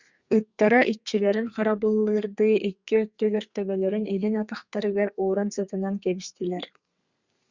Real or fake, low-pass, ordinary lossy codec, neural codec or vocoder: fake; 7.2 kHz; Opus, 64 kbps; codec, 32 kHz, 1.9 kbps, SNAC